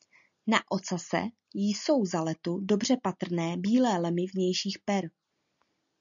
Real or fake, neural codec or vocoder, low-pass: real; none; 7.2 kHz